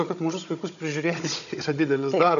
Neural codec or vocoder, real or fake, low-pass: codec, 16 kHz, 16 kbps, FunCodec, trained on Chinese and English, 50 frames a second; fake; 7.2 kHz